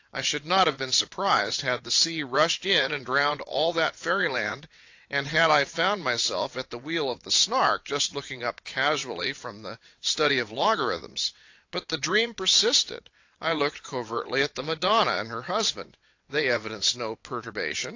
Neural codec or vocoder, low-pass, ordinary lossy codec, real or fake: vocoder, 22.05 kHz, 80 mel bands, WaveNeXt; 7.2 kHz; AAC, 48 kbps; fake